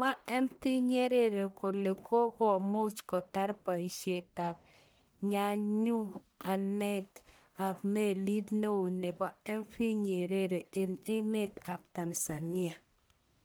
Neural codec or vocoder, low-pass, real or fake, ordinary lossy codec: codec, 44.1 kHz, 1.7 kbps, Pupu-Codec; none; fake; none